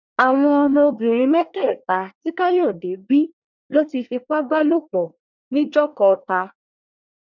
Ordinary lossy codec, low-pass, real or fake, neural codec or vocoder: none; 7.2 kHz; fake; codec, 24 kHz, 1 kbps, SNAC